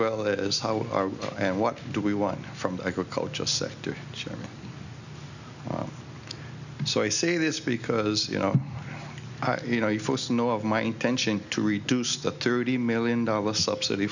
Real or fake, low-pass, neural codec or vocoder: real; 7.2 kHz; none